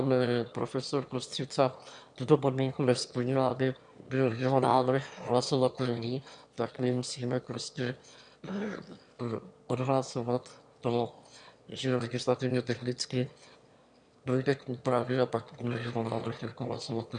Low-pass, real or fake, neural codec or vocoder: 9.9 kHz; fake; autoencoder, 22.05 kHz, a latent of 192 numbers a frame, VITS, trained on one speaker